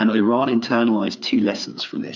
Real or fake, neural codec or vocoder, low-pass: fake; codec, 16 kHz, 4 kbps, FreqCodec, larger model; 7.2 kHz